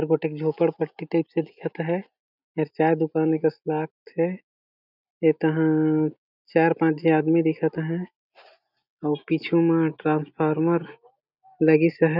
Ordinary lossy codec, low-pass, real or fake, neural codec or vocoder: none; 5.4 kHz; real; none